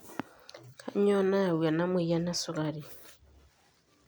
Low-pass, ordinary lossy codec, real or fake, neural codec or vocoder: none; none; real; none